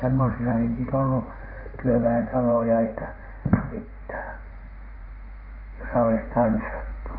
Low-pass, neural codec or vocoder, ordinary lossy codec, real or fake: 5.4 kHz; codec, 16 kHz in and 24 kHz out, 2.2 kbps, FireRedTTS-2 codec; none; fake